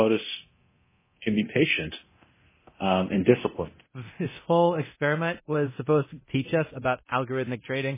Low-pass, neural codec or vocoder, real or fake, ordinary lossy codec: 3.6 kHz; codec, 24 kHz, 0.9 kbps, DualCodec; fake; MP3, 16 kbps